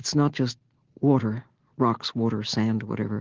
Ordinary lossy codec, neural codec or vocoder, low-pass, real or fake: Opus, 16 kbps; none; 7.2 kHz; real